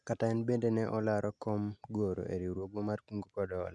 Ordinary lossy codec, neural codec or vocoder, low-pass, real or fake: Opus, 64 kbps; none; 9.9 kHz; real